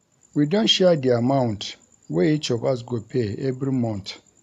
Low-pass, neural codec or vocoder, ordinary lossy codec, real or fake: 14.4 kHz; none; none; real